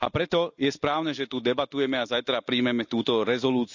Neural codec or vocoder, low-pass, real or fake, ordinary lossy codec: none; 7.2 kHz; real; none